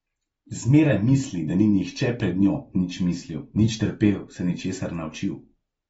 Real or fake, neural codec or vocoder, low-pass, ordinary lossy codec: real; none; 19.8 kHz; AAC, 24 kbps